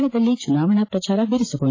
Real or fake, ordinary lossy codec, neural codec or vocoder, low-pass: fake; AAC, 32 kbps; vocoder, 44.1 kHz, 128 mel bands every 512 samples, BigVGAN v2; 7.2 kHz